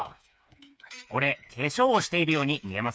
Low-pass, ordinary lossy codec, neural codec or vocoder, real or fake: none; none; codec, 16 kHz, 4 kbps, FreqCodec, smaller model; fake